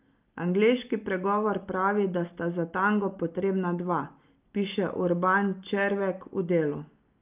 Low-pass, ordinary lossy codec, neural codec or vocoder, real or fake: 3.6 kHz; Opus, 24 kbps; none; real